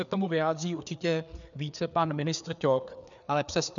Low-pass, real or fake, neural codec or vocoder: 7.2 kHz; fake; codec, 16 kHz, 4 kbps, FreqCodec, larger model